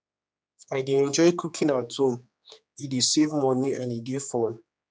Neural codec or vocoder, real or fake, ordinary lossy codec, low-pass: codec, 16 kHz, 2 kbps, X-Codec, HuBERT features, trained on general audio; fake; none; none